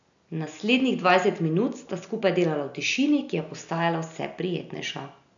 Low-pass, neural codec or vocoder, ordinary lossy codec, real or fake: 7.2 kHz; none; none; real